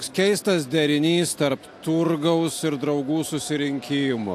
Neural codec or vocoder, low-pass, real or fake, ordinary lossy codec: none; 14.4 kHz; real; AAC, 64 kbps